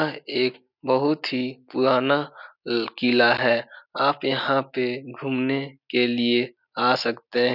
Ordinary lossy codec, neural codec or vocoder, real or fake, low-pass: none; none; real; 5.4 kHz